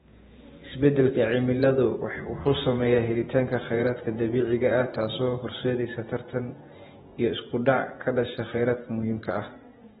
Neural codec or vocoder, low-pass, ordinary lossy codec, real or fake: vocoder, 48 kHz, 128 mel bands, Vocos; 19.8 kHz; AAC, 16 kbps; fake